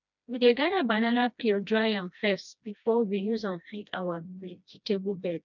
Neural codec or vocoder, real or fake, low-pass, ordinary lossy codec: codec, 16 kHz, 1 kbps, FreqCodec, smaller model; fake; 7.2 kHz; none